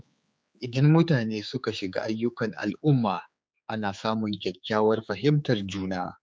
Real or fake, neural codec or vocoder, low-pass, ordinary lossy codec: fake; codec, 16 kHz, 4 kbps, X-Codec, HuBERT features, trained on general audio; none; none